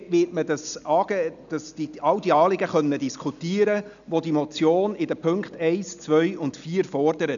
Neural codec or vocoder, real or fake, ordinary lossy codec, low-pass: none; real; none; 7.2 kHz